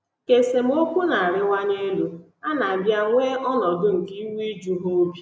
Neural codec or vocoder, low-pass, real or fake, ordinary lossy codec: none; none; real; none